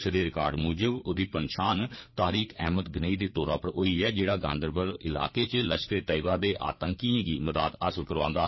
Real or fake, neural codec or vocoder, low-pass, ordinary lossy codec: fake; codec, 16 kHz, 4 kbps, FreqCodec, larger model; 7.2 kHz; MP3, 24 kbps